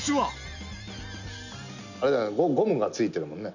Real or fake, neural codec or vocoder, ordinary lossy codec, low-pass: real; none; none; 7.2 kHz